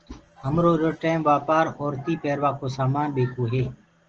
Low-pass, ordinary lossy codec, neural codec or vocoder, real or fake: 7.2 kHz; Opus, 16 kbps; none; real